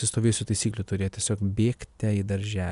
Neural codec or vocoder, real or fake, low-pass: none; real; 10.8 kHz